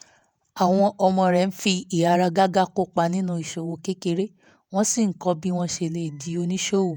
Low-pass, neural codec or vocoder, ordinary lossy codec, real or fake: none; vocoder, 48 kHz, 128 mel bands, Vocos; none; fake